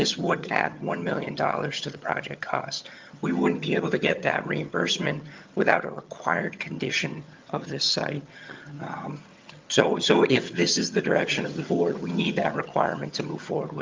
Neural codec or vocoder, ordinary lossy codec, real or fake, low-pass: vocoder, 22.05 kHz, 80 mel bands, HiFi-GAN; Opus, 24 kbps; fake; 7.2 kHz